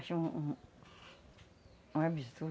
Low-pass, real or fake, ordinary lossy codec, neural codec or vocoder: none; real; none; none